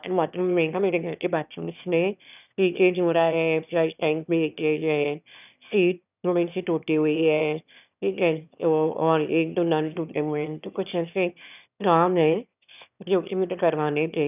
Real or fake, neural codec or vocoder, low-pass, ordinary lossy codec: fake; autoencoder, 22.05 kHz, a latent of 192 numbers a frame, VITS, trained on one speaker; 3.6 kHz; none